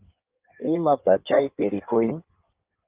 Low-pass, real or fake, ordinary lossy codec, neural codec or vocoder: 3.6 kHz; fake; Opus, 24 kbps; codec, 16 kHz in and 24 kHz out, 1.1 kbps, FireRedTTS-2 codec